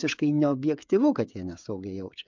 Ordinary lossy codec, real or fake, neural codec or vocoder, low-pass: MP3, 64 kbps; fake; codec, 16 kHz, 16 kbps, FreqCodec, smaller model; 7.2 kHz